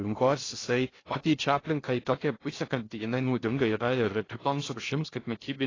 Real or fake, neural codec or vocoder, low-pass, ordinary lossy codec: fake; codec, 16 kHz in and 24 kHz out, 0.6 kbps, FocalCodec, streaming, 2048 codes; 7.2 kHz; AAC, 32 kbps